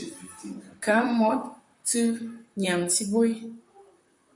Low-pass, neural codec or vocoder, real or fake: 10.8 kHz; vocoder, 44.1 kHz, 128 mel bands, Pupu-Vocoder; fake